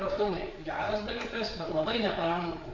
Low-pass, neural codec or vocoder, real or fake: 7.2 kHz; codec, 16 kHz, 4 kbps, FreqCodec, larger model; fake